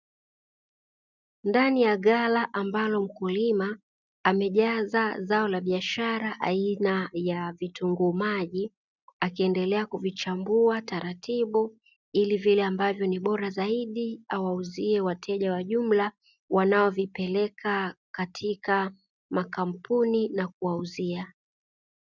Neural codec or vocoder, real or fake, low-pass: none; real; 7.2 kHz